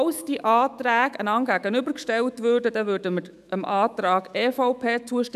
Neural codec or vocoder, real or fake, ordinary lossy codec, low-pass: autoencoder, 48 kHz, 128 numbers a frame, DAC-VAE, trained on Japanese speech; fake; none; 14.4 kHz